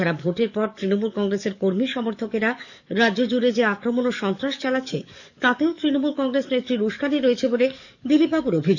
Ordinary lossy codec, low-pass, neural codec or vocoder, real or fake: none; 7.2 kHz; codec, 44.1 kHz, 7.8 kbps, DAC; fake